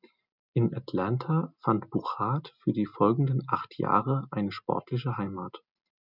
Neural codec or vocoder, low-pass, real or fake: none; 5.4 kHz; real